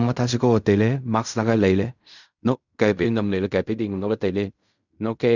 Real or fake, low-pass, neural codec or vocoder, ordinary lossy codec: fake; 7.2 kHz; codec, 16 kHz in and 24 kHz out, 0.4 kbps, LongCat-Audio-Codec, fine tuned four codebook decoder; none